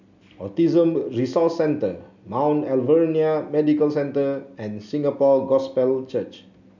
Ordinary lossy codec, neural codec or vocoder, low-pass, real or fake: none; none; 7.2 kHz; real